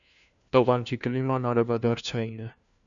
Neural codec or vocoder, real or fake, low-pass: codec, 16 kHz, 1 kbps, FunCodec, trained on LibriTTS, 50 frames a second; fake; 7.2 kHz